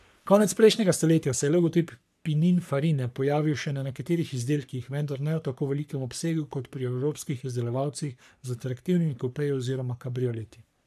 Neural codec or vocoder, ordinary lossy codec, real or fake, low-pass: codec, 44.1 kHz, 3.4 kbps, Pupu-Codec; none; fake; 14.4 kHz